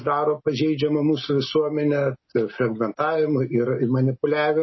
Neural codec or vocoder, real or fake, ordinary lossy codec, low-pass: none; real; MP3, 24 kbps; 7.2 kHz